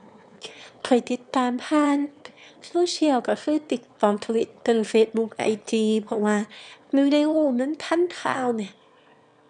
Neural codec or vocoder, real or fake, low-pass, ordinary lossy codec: autoencoder, 22.05 kHz, a latent of 192 numbers a frame, VITS, trained on one speaker; fake; 9.9 kHz; none